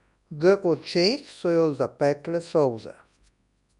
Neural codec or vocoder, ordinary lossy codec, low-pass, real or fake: codec, 24 kHz, 0.9 kbps, WavTokenizer, large speech release; none; 10.8 kHz; fake